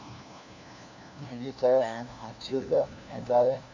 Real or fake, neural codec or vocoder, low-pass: fake; codec, 16 kHz, 1 kbps, FunCodec, trained on LibriTTS, 50 frames a second; 7.2 kHz